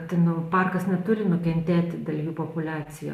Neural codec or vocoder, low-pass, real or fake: none; 14.4 kHz; real